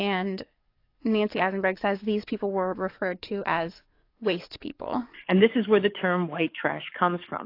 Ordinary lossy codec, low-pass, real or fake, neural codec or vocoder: AAC, 32 kbps; 5.4 kHz; fake; codec, 44.1 kHz, 7.8 kbps, DAC